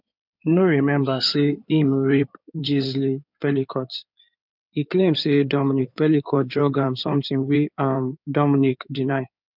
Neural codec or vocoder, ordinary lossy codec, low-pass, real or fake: codec, 16 kHz in and 24 kHz out, 2.2 kbps, FireRedTTS-2 codec; none; 5.4 kHz; fake